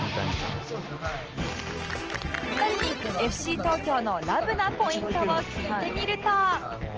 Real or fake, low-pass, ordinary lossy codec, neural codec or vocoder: real; 7.2 kHz; Opus, 16 kbps; none